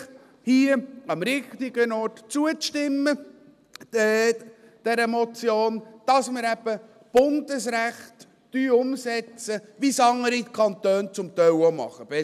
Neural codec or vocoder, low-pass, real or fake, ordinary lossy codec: none; 14.4 kHz; real; none